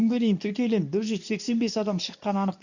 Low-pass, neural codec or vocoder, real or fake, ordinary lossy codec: 7.2 kHz; codec, 24 kHz, 0.9 kbps, WavTokenizer, medium speech release version 2; fake; none